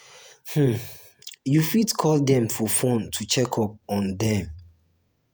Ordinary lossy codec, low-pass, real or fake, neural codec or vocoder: none; none; fake; vocoder, 48 kHz, 128 mel bands, Vocos